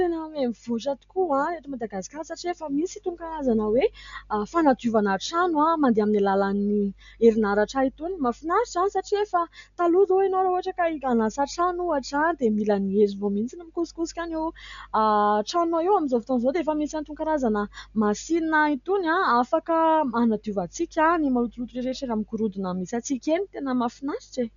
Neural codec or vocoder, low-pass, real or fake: none; 7.2 kHz; real